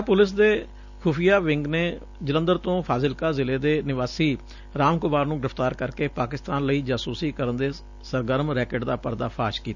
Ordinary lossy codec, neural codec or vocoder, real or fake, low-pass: none; none; real; 7.2 kHz